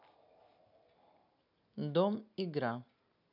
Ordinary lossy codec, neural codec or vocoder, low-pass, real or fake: none; none; 5.4 kHz; real